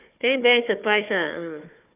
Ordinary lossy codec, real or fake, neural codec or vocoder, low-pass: none; fake; codec, 16 kHz, 4 kbps, FunCodec, trained on Chinese and English, 50 frames a second; 3.6 kHz